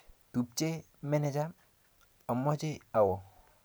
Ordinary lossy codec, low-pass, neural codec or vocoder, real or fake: none; none; none; real